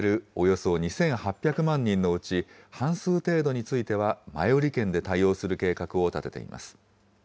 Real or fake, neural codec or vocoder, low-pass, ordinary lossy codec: real; none; none; none